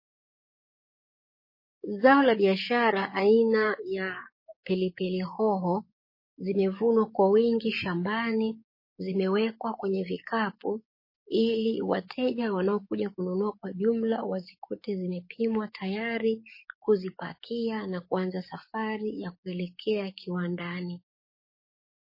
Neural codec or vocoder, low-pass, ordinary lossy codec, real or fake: codec, 44.1 kHz, 7.8 kbps, DAC; 5.4 kHz; MP3, 24 kbps; fake